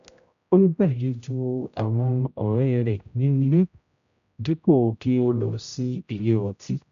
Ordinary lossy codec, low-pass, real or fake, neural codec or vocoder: MP3, 96 kbps; 7.2 kHz; fake; codec, 16 kHz, 0.5 kbps, X-Codec, HuBERT features, trained on general audio